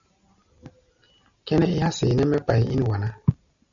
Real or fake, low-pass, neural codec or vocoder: real; 7.2 kHz; none